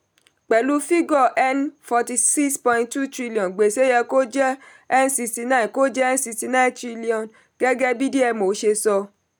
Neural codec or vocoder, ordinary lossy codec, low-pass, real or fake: none; none; none; real